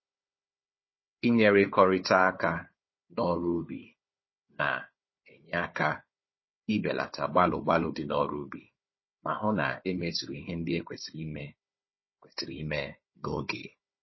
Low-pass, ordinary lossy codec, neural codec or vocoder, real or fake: 7.2 kHz; MP3, 24 kbps; codec, 16 kHz, 4 kbps, FunCodec, trained on Chinese and English, 50 frames a second; fake